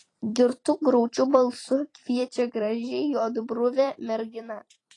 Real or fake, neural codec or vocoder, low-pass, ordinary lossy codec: fake; vocoder, 44.1 kHz, 128 mel bands every 256 samples, BigVGAN v2; 10.8 kHz; AAC, 32 kbps